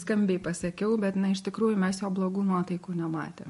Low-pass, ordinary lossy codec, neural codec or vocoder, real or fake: 14.4 kHz; MP3, 48 kbps; none; real